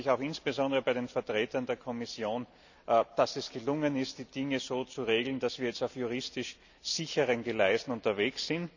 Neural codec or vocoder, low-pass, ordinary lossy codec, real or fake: none; 7.2 kHz; none; real